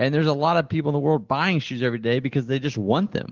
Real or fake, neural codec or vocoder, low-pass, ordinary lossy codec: real; none; 7.2 kHz; Opus, 16 kbps